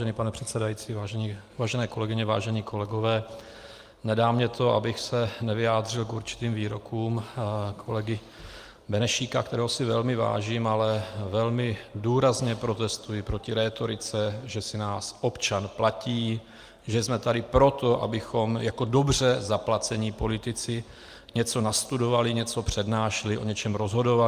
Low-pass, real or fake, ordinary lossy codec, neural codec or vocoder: 14.4 kHz; real; Opus, 32 kbps; none